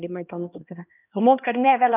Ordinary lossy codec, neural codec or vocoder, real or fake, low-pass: none; codec, 16 kHz, 1 kbps, X-Codec, HuBERT features, trained on LibriSpeech; fake; 3.6 kHz